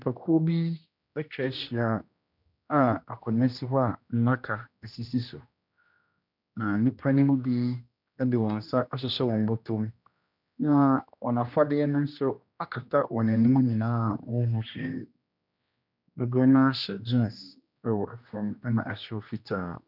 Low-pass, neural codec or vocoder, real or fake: 5.4 kHz; codec, 16 kHz, 1 kbps, X-Codec, HuBERT features, trained on general audio; fake